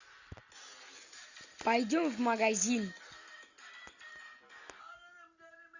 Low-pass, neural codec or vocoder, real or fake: 7.2 kHz; none; real